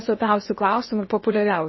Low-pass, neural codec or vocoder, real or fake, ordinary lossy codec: 7.2 kHz; codec, 16 kHz in and 24 kHz out, 0.8 kbps, FocalCodec, streaming, 65536 codes; fake; MP3, 24 kbps